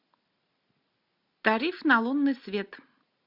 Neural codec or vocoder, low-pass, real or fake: none; 5.4 kHz; real